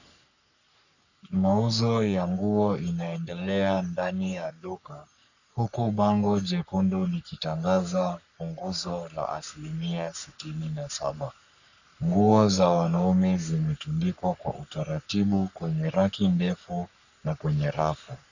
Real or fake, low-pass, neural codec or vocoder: fake; 7.2 kHz; codec, 44.1 kHz, 3.4 kbps, Pupu-Codec